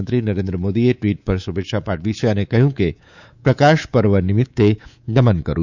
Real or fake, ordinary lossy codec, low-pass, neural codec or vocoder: fake; none; 7.2 kHz; codec, 24 kHz, 3.1 kbps, DualCodec